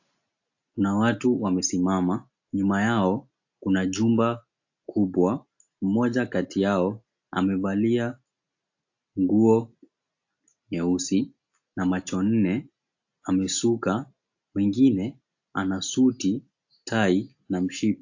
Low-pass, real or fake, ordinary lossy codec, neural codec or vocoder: 7.2 kHz; real; AAC, 48 kbps; none